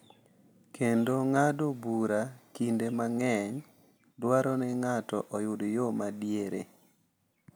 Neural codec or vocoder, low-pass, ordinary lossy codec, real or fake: none; none; none; real